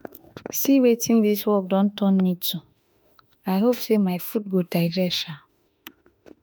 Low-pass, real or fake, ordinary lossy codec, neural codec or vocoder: none; fake; none; autoencoder, 48 kHz, 32 numbers a frame, DAC-VAE, trained on Japanese speech